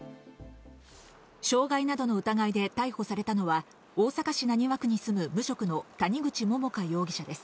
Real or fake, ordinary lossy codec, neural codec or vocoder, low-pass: real; none; none; none